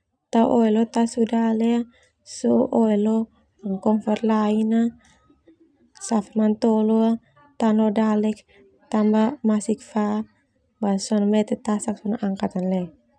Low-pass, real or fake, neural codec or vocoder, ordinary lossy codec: 9.9 kHz; real; none; none